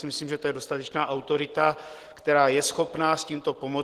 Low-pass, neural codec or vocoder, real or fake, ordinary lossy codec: 14.4 kHz; none; real; Opus, 16 kbps